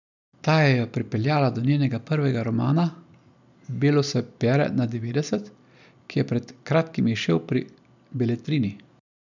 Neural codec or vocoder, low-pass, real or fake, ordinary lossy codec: none; 7.2 kHz; real; none